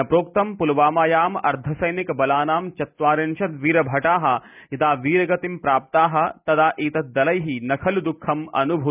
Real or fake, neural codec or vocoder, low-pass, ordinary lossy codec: real; none; 3.6 kHz; none